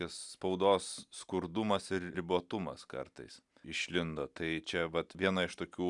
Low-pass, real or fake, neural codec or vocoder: 10.8 kHz; real; none